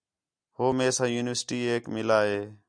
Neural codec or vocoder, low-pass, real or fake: none; 9.9 kHz; real